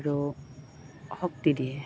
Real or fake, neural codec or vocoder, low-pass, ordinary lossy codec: real; none; none; none